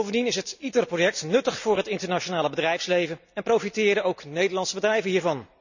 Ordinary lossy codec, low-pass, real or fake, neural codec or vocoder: none; 7.2 kHz; real; none